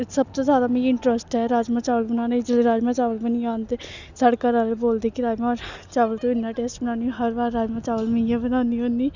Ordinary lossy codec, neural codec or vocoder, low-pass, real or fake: none; none; 7.2 kHz; real